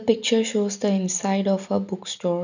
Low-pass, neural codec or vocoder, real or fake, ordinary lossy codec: 7.2 kHz; none; real; none